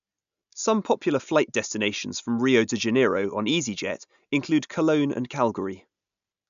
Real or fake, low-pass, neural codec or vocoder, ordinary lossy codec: real; 7.2 kHz; none; none